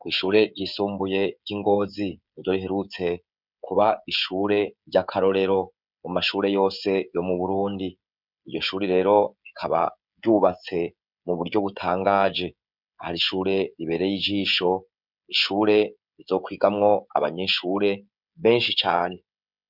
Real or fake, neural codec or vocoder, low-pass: fake; codec, 16 kHz, 16 kbps, FreqCodec, smaller model; 5.4 kHz